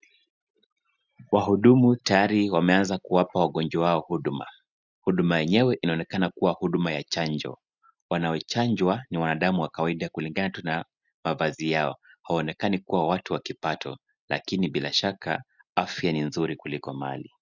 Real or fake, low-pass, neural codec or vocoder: real; 7.2 kHz; none